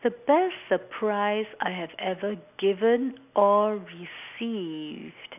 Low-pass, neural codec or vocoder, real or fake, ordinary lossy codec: 3.6 kHz; none; real; none